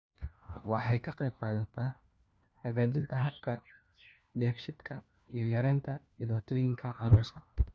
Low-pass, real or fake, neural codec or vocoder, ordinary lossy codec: none; fake; codec, 16 kHz, 1 kbps, FunCodec, trained on LibriTTS, 50 frames a second; none